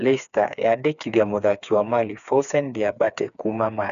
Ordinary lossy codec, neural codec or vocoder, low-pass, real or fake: none; codec, 16 kHz, 4 kbps, FreqCodec, smaller model; 7.2 kHz; fake